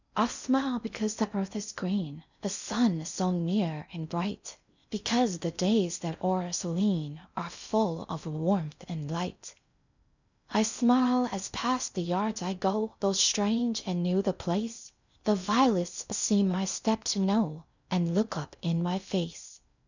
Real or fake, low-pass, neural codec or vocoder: fake; 7.2 kHz; codec, 16 kHz in and 24 kHz out, 0.6 kbps, FocalCodec, streaming, 2048 codes